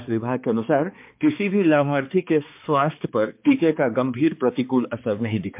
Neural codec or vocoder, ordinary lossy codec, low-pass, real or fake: codec, 16 kHz, 2 kbps, X-Codec, HuBERT features, trained on balanced general audio; MP3, 32 kbps; 3.6 kHz; fake